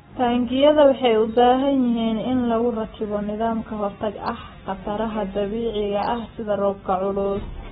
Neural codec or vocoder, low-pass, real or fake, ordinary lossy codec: none; 7.2 kHz; real; AAC, 16 kbps